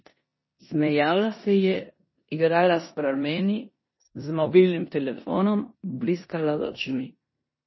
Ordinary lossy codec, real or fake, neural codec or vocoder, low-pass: MP3, 24 kbps; fake; codec, 16 kHz in and 24 kHz out, 0.9 kbps, LongCat-Audio-Codec, four codebook decoder; 7.2 kHz